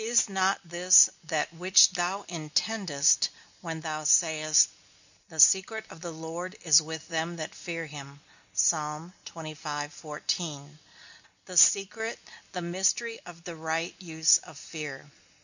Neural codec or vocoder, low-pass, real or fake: none; 7.2 kHz; real